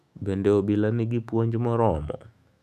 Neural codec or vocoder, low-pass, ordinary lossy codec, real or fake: autoencoder, 48 kHz, 128 numbers a frame, DAC-VAE, trained on Japanese speech; 14.4 kHz; none; fake